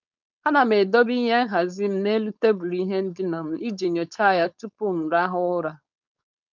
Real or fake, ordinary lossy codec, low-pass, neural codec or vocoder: fake; none; 7.2 kHz; codec, 16 kHz, 4.8 kbps, FACodec